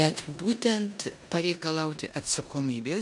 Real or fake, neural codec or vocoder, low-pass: fake; codec, 16 kHz in and 24 kHz out, 0.9 kbps, LongCat-Audio-Codec, four codebook decoder; 10.8 kHz